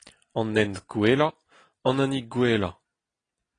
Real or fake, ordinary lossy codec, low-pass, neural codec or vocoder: real; AAC, 32 kbps; 9.9 kHz; none